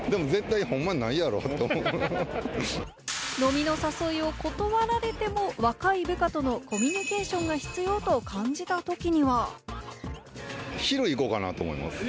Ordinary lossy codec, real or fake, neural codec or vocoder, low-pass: none; real; none; none